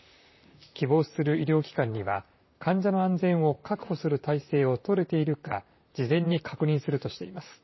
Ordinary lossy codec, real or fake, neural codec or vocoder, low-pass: MP3, 24 kbps; fake; vocoder, 44.1 kHz, 128 mel bands, Pupu-Vocoder; 7.2 kHz